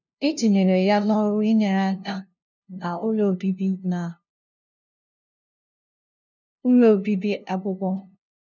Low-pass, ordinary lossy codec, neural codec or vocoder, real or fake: 7.2 kHz; none; codec, 16 kHz, 0.5 kbps, FunCodec, trained on LibriTTS, 25 frames a second; fake